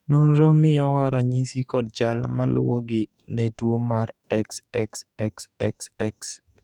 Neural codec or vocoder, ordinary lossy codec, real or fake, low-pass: codec, 44.1 kHz, 2.6 kbps, DAC; none; fake; 19.8 kHz